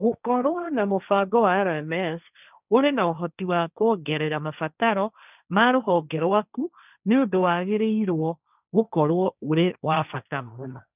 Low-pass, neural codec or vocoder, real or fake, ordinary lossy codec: 3.6 kHz; codec, 16 kHz, 1.1 kbps, Voila-Tokenizer; fake; none